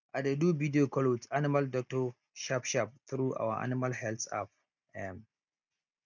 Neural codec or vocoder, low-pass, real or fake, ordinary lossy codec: none; 7.2 kHz; real; none